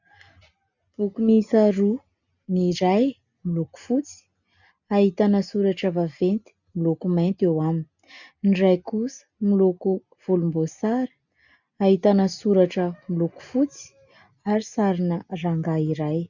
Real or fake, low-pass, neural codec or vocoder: real; 7.2 kHz; none